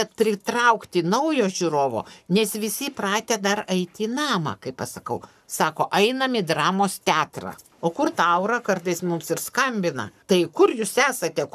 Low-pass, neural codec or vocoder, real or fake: 14.4 kHz; codec, 44.1 kHz, 7.8 kbps, Pupu-Codec; fake